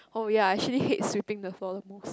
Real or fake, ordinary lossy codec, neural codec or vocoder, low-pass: real; none; none; none